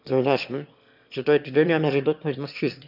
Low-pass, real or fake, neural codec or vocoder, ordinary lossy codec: 5.4 kHz; fake; autoencoder, 22.05 kHz, a latent of 192 numbers a frame, VITS, trained on one speaker; none